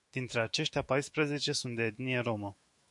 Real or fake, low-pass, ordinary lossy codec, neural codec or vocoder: fake; 10.8 kHz; MP3, 64 kbps; autoencoder, 48 kHz, 128 numbers a frame, DAC-VAE, trained on Japanese speech